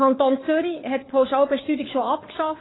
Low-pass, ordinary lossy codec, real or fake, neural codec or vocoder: 7.2 kHz; AAC, 16 kbps; fake; codec, 16 kHz, 4 kbps, FunCodec, trained on LibriTTS, 50 frames a second